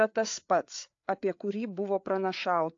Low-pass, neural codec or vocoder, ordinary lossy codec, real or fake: 7.2 kHz; codec, 16 kHz, 4 kbps, FreqCodec, larger model; AAC, 48 kbps; fake